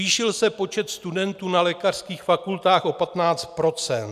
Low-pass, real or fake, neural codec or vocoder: 14.4 kHz; real; none